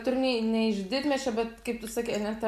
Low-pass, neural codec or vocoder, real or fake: 14.4 kHz; none; real